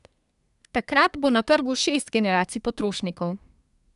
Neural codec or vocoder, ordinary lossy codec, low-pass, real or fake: codec, 24 kHz, 1 kbps, SNAC; none; 10.8 kHz; fake